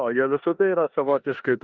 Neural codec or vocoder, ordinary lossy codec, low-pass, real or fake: codec, 16 kHz in and 24 kHz out, 0.9 kbps, LongCat-Audio-Codec, four codebook decoder; Opus, 32 kbps; 7.2 kHz; fake